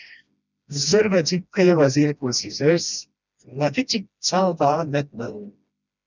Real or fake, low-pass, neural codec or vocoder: fake; 7.2 kHz; codec, 16 kHz, 1 kbps, FreqCodec, smaller model